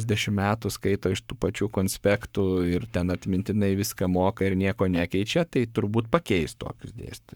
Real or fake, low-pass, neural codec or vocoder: fake; 19.8 kHz; codec, 44.1 kHz, 7.8 kbps, Pupu-Codec